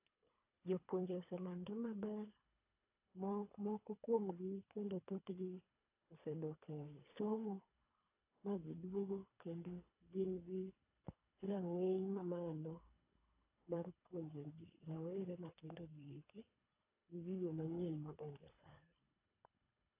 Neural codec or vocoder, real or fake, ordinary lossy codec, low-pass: codec, 24 kHz, 3 kbps, HILCodec; fake; AAC, 24 kbps; 3.6 kHz